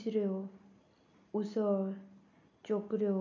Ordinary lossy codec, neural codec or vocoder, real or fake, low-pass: none; none; real; 7.2 kHz